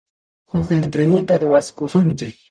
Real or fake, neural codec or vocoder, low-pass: fake; codec, 44.1 kHz, 0.9 kbps, DAC; 9.9 kHz